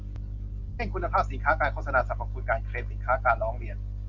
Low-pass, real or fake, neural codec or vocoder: 7.2 kHz; real; none